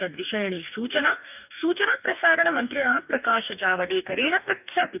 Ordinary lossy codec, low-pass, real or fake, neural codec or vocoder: none; 3.6 kHz; fake; codec, 44.1 kHz, 2.6 kbps, DAC